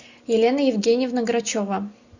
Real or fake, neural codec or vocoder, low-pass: real; none; 7.2 kHz